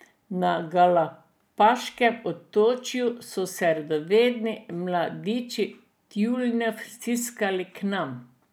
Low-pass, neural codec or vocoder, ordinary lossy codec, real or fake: none; none; none; real